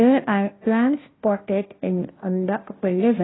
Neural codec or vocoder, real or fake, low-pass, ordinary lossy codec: codec, 16 kHz, 0.5 kbps, FunCodec, trained on Chinese and English, 25 frames a second; fake; 7.2 kHz; AAC, 16 kbps